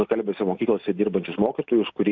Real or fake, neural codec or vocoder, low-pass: real; none; 7.2 kHz